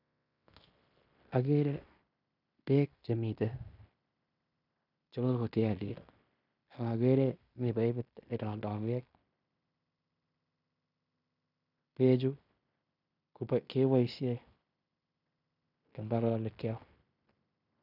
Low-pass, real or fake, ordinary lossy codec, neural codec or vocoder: 5.4 kHz; fake; none; codec, 16 kHz in and 24 kHz out, 0.9 kbps, LongCat-Audio-Codec, fine tuned four codebook decoder